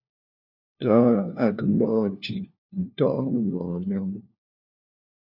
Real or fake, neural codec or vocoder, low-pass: fake; codec, 16 kHz, 1 kbps, FunCodec, trained on LibriTTS, 50 frames a second; 5.4 kHz